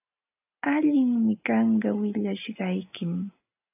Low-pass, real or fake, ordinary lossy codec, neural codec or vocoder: 3.6 kHz; real; AAC, 32 kbps; none